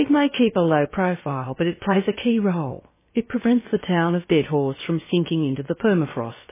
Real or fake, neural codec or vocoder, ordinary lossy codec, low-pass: fake; codec, 16 kHz, about 1 kbps, DyCAST, with the encoder's durations; MP3, 16 kbps; 3.6 kHz